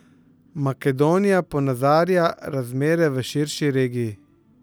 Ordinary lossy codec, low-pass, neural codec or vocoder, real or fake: none; none; none; real